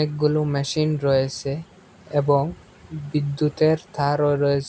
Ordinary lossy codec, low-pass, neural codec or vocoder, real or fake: none; none; none; real